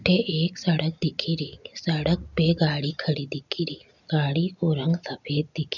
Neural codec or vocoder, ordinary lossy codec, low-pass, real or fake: none; none; 7.2 kHz; real